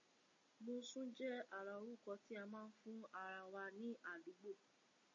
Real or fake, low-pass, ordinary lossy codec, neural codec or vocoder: real; 7.2 kHz; MP3, 32 kbps; none